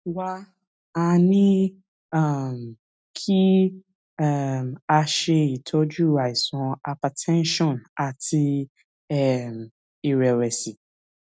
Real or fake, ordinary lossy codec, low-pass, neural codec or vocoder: real; none; none; none